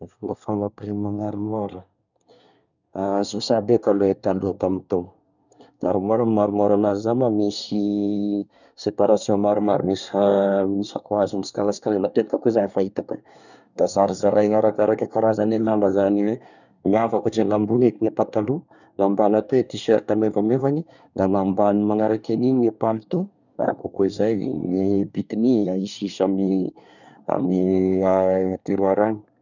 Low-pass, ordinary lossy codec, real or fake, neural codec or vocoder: 7.2 kHz; none; fake; codec, 24 kHz, 1 kbps, SNAC